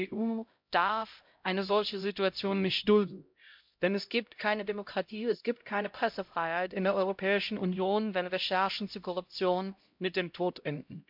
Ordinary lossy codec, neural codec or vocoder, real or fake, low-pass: MP3, 48 kbps; codec, 16 kHz, 0.5 kbps, X-Codec, HuBERT features, trained on LibriSpeech; fake; 5.4 kHz